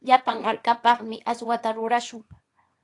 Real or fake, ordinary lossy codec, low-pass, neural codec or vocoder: fake; AAC, 48 kbps; 10.8 kHz; codec, 24 kHz, 0.9 kbps, WavTokenizer, small release